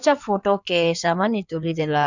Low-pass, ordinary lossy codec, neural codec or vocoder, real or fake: 7.2 kHz; none; codec, 16 kHz in and 24 kHz out, 2.2 kbps, FireRedTTS-2 codec; fake